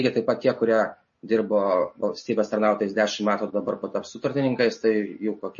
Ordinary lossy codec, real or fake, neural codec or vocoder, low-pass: MP3, 32 kbps; real; none; 7.2 kHz